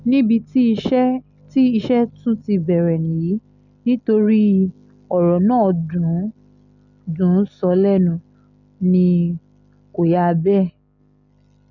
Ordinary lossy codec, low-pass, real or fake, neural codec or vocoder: none; 7.2 kHz; real; none